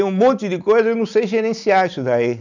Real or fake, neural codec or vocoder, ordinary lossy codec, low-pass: real; none; none; 7.2 kHz